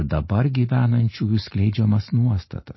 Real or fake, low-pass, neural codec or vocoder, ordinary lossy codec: real; 7.2 kHz; none; MP3, 24 kbps